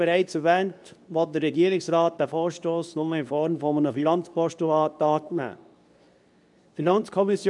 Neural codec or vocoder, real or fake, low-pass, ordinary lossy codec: codec, 24 kHz, 0.9 kbps, WavTokenizer, medium speech release version 2; fake; 10.8 kHz; none